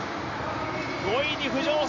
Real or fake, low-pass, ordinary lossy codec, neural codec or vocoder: real; 7.2 kHz; none; none